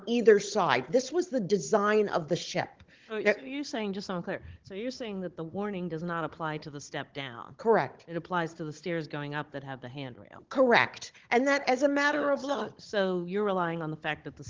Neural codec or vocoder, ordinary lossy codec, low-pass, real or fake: codec, 16 kHz, 16 kbps, FunCodec, trained on Chinese and English, 50 frames a second; Opus, 16 kbps; 7.2 kHz; fake